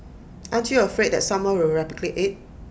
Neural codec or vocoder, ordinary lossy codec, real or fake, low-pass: none; none; real; none